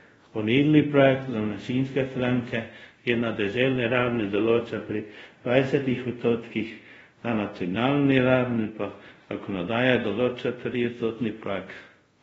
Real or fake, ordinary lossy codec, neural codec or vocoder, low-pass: fake; AAC, 24 kbps; codec, 24 kHz, 0.5 kbps, DualCodec; 10.8 kHz